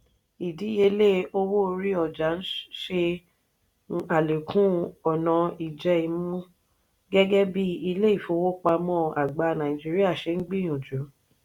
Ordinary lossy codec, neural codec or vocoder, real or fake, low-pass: none; none; real; 19.8 kHz